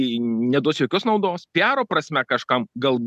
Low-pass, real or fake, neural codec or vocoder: 14.4 kHz; real; none